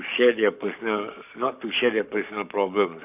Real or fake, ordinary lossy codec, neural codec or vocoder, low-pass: fake; none; codec, 44.1 kHz, 7.8 kbps, Pupu-Codec; 3.6 kHz